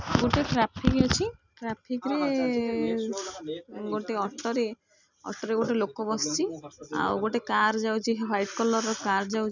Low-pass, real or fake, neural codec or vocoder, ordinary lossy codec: 7.2 kHz; real; none; none